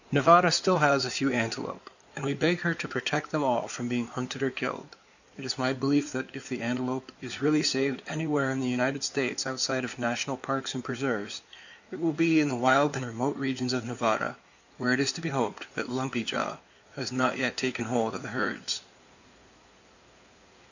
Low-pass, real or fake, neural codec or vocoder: 7.2 kHz; fake; codec, 16 kHz in and 24 kHz out, 2.2 kbps, FireRedTTS-2 codec